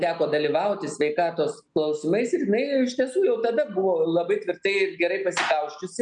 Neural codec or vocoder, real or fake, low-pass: none; real; 9.9 kHz